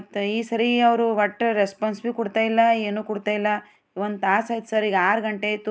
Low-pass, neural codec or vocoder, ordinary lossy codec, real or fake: none; none; none; real